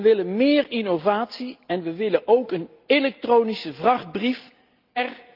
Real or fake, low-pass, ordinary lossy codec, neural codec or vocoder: real; 5.4 kHz; Opus, 24 kbps; none